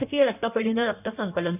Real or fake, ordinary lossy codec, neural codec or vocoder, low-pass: fake; none; codec, 16 kHz in and 24 kHz out, 1.1 kbps, FireRedTTS-2 codec; 3.6 kHz